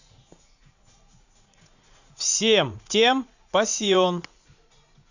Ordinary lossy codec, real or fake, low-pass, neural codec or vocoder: none; fake; 7.2 kHz; vocoder, 44.1 kHz, 80 mel bands, Vocos